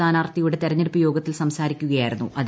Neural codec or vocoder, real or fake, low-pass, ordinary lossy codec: none; real; none; none